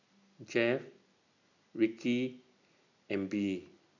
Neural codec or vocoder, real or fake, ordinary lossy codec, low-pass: none; real; none; 7.2 kHz